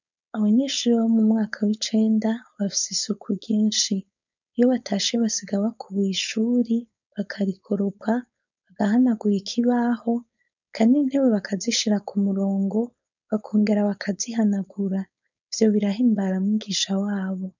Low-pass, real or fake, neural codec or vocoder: 7.2 kHz; fake; codec, 16 kHz, 4.8 kbps, FACodec